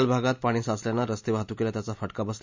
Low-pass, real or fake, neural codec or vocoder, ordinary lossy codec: 7.2 kHz; real; none; MP3, 64 kbps